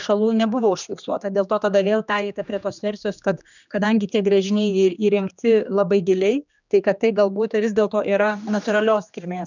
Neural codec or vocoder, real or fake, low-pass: codec, 16 kHz, 2 kbps, X-Codec, HuBERT features, trained on general audio; fake; 7.2 kHz